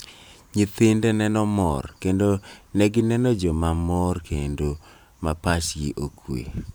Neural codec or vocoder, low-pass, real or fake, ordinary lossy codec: vocoder, 44.1 kHz, 128 mel bands every 512 samples, BigVGAN v2; none; fake; none